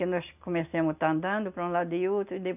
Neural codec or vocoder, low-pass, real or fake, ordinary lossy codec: none; 3.6 kHz; real; none